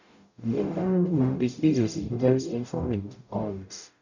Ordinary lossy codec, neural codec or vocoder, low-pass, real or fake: none; codec, 44.1 kHz, 0.9 kbps, DAC; 7.2 kHz; fake